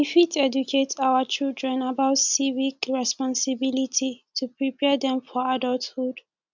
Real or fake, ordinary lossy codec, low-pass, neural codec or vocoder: real; none; 7.2 kHz; none